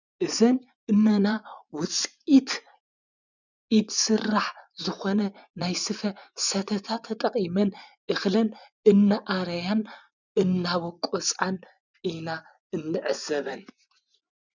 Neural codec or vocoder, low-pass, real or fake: vocoder, 24 kHz, 100 mel bands, Vocos; 7.2 kHz; fake